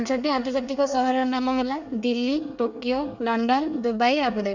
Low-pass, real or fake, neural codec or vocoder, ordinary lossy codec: 7.2 kHz; fake; codec, 24 kHz, 1 kbps, SNAC; none